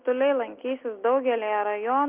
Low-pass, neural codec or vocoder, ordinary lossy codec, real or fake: 3.6 kHz; none; Opus, 32 kbps; real